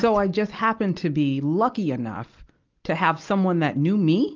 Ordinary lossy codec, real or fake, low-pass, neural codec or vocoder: Opus, 24 kbps; real; 7.2 kHz; none